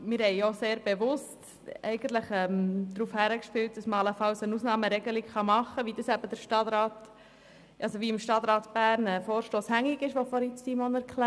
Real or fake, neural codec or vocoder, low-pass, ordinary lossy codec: real; none; none; none